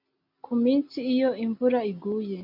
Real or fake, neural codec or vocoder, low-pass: real; none; 5.4 kHz